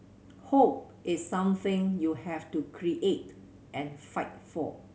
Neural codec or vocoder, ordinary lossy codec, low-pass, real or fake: none; none; none; real